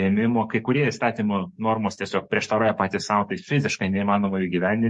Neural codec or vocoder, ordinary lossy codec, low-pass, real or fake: codec, 44.1 kHz, 7.8 kbps, DAC; MP3, 48 kbps; 10.8 kHz; fake